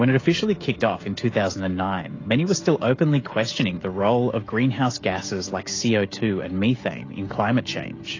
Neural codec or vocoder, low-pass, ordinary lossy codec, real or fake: codec, 16 kHz, 16 kbps, FreqCodec, smaller model; 7.2 kHz; AAC, 32 kbps; fake